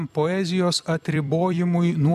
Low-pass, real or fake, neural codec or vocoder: 14.4 kHz; fake; vocoder, 44.1 kHz, 128 mel bands every 256 samples, BigVGAN v2